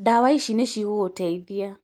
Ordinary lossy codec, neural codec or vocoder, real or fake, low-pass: Opus, 32 kbps; none; real; 19.8 kHz